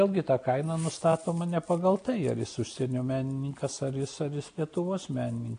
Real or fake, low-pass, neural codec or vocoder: real; 9.9 kHz; none